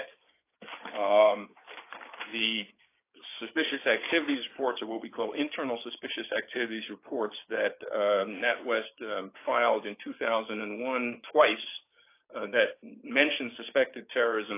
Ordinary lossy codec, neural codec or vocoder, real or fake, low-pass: AAC, 24 kbps; codec, 16 kHz, 6 kbps, DAC; fake; 3.6 kHz